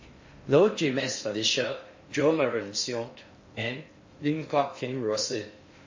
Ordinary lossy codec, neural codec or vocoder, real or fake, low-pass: MP3, 32 kbps; codec, 16 kHz in and 24 kHz out, 0.6 kbps, FocalCodec, streaming, 4096 codes; fake; 7.2 kHz